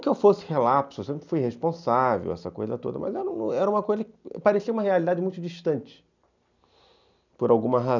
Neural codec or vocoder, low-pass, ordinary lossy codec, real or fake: none; 7.2 kHz; none; real